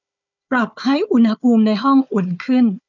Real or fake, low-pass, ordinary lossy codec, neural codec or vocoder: fake; 7.2 kHz; none; codec, 16 kHz, 4 kbps, FunCodec, trained on Chinese and English, 50 frames a second